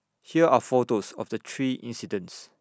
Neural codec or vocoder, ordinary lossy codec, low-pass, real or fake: none; none; none; real